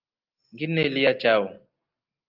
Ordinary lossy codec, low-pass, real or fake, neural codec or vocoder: Opus, 24 kbps; 5.4 kHz; real; none